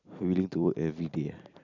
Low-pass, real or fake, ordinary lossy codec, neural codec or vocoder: 7.2 kHz; fake; none; vocoder, 44.1 kHz, 128 mel bands every 256 samples, BigVGAN v2